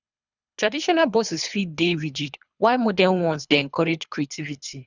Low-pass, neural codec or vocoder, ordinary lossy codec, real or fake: 7.2 kHz; codec, 24 kHz, 3 kbps, HILCodec; none; fake